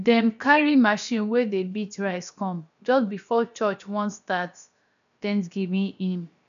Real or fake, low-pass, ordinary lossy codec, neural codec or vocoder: fake; 7.2 kHz; none; codec, 16 kHz, about 1 kbps, DyCAST, with the encoder's durations